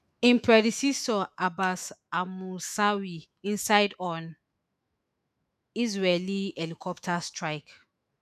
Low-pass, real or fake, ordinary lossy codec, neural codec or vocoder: 14.4 kHz; fake; none; autoencoder, 48 kHz, 128 numbers a frame, DAC-VAE, trained on Japanese speech